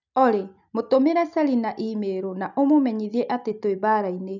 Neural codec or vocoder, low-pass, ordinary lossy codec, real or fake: none; 7.2 kHz; none; real